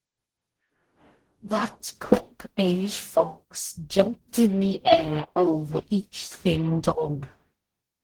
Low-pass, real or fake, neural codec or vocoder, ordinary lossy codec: 19.8 kHz; fake; codec, 44.1 kHz, 0.9 kbps, DAC; Opus, 16 kbps